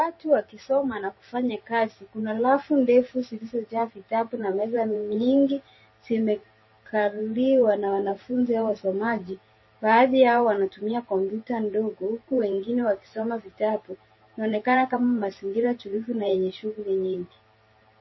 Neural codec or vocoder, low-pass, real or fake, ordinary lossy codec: vocoder, 44.1 kHz, 128 mel bands every 512 samples, BigVGAN v2; 7.2 kHz; fake; MP3, 24 kbps